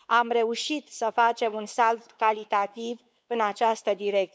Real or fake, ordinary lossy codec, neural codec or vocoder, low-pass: fake; none; codec, 16 kHz, 4 kbps, X-Codec, WavLM features, trained on Multilingual LibriSpeech; none